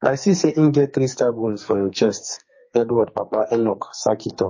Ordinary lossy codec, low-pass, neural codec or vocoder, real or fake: MP3, 32 kbps; 7.2 kHz; codec, 44.1 kHz, 2.6 kbps, SNAC; fake